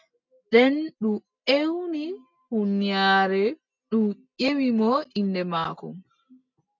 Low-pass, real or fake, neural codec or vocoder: 7.2 kHz; real; none